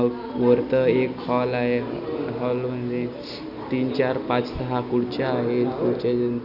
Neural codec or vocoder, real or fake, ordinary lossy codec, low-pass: none; real; none; 5.4 kHz